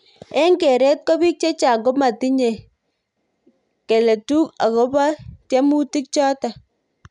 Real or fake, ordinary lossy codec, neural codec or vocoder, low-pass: real; none; none; 10.8 kHz